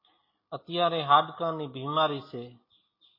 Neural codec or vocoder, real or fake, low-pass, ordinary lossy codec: none; real; 5.4 kHz; MP3, 24 kbps